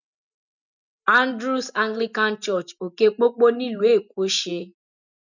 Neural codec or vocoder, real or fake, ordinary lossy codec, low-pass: none; real; none; 7.2 kHz